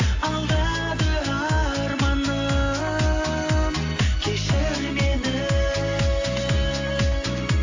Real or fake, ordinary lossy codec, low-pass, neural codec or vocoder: real; AAC, 48 kbps; 7.2 kHz; none